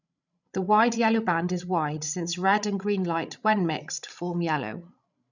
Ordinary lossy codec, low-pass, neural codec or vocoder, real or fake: none; 7.2 kHz; codec, 16 kHz, 16 kbps, FreqCodec, larger model; fake